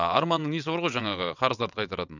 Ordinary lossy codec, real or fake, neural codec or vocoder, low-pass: none; fake; vocoder, 22.05 kHz, 80 mel bands, WaveNeXt; 7.2 kHz